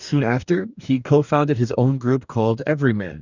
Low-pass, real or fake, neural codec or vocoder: 7.2 kHz; fake; codec, 44.1 kHz, 2.6 kbps, DAC